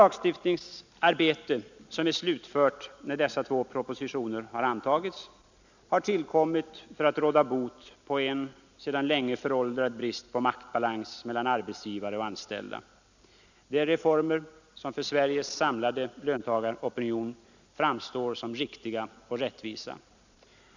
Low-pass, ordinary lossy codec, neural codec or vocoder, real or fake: 7.2 kHz; none; none; real